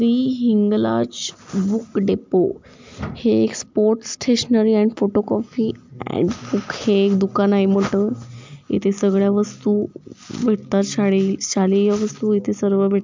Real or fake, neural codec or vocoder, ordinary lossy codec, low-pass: real; none; MP3, 64 kbps; 7.2 kHz